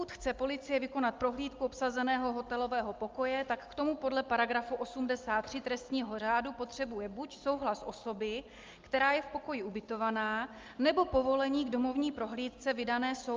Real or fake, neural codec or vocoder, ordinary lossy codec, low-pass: real; none; Opus, 24 kbps; 7.2 kHz